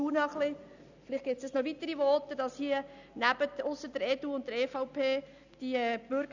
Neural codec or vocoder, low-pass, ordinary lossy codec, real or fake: none; 7.2 kHz; none; real